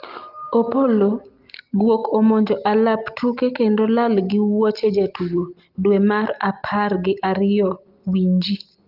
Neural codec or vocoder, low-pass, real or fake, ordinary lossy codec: none; 5.4 kHz; real; Opus, 32 kbps